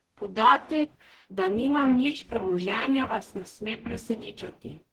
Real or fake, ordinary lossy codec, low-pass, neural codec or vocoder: fake; Opus, 16 kbps; 19.8 kHz; codec, 44.1 kHz, 0.9 kbps, DAC